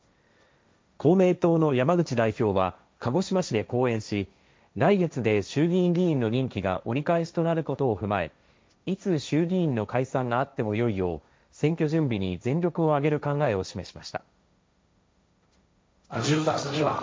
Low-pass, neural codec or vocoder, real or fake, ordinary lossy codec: none; codec, 16 kHz, 1.1 kbps, Voila-Tokenizer; fake; none